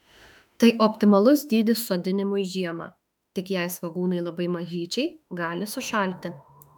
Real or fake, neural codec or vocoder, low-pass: fake; autoencoder, 48 kHz, 32 numbers a frame, DAC-VAE, trained on Japanese speech; 19.8 kHz